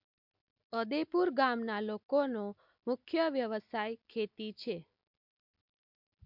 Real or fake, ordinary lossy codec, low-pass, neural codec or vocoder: real; MP3, 48 kbps; 5.4 kHz; none